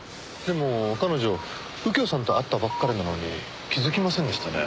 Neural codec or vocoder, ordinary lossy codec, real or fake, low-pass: none; none; real; none